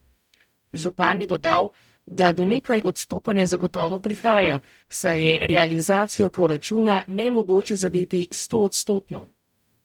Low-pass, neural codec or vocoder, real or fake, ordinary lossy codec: 19.8 kHz; codec, 44.1 kHz, 0.9 kbps, DAC; fake; none